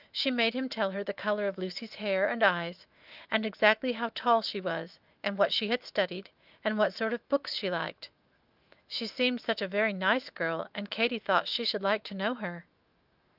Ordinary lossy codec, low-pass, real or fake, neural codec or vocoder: Opus, 24 kbps; 5.4 kHz; fake; autoencoder, 48 kHz, 128 numbers a frame, DAC-VAE, trained on Japanese speech